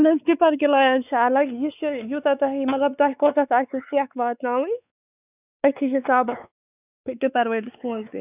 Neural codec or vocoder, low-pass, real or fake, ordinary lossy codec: codec, 16 kHz, 4 kbps, X-Codec, WavLM features, trained on Multilingual LibriSpeech; 3.6 kHz; fake; none